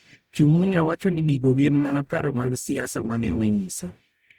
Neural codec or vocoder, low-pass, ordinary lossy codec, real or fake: codec, 44.1 kHz, 0.9 kbps, DAC; 19.8 kHz; Opus, 64 kbps; fake